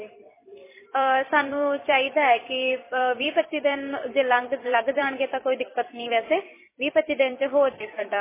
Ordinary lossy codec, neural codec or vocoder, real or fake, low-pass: MP3, 16 kbps; vocoder, 44.1 kHz, 128 mel bands every 256 samples, BigVGAN v2; fake; 3.6 kHz